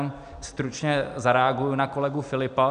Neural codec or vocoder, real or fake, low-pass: none; real; 9.9 kHz